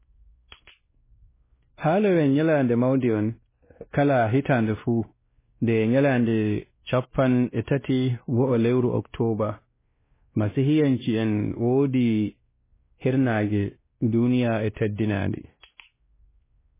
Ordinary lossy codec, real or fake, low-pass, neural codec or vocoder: MP3, 16 kbps; fake; 3.6 kHz; codec, 16 kHz, 1 kbps, X-Codec, WavLM features, trained on Multilingual LibriSpeech